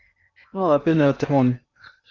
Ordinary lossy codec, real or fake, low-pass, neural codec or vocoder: Opus, 64 kbps; fake; 7.2 kHz; codec, 16 kHz in and 24 kHz out, 0.8 kbps, FocalCodec, streaming, 65536 codes